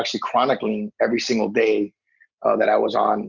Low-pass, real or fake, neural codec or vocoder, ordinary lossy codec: 7.2 kHz; real; none; Opus, 64 kbps